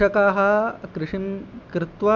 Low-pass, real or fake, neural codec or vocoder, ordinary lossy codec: 7.2 kHz; real; none; MP3, 64 kbps